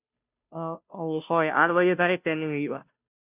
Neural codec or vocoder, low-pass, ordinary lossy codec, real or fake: codec, 16 kHz, 0.5 kbps, FunCodec, trained on Chinese and English, 25 frames a second; 3.6 kHz; none; fake